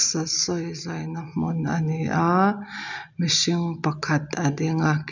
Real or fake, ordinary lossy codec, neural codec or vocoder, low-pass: real; none; none; 7.2 kHz